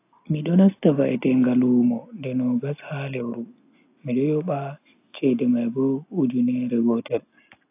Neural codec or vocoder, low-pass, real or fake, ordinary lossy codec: none; 3.6 kHz; real; AAC, 24 kbps